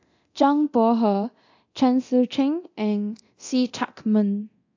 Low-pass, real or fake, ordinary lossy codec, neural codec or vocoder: 7.2 kHz; fake; AAC, 48 kbps; codec, 24 kHz, 0.9 kbps, DualCodec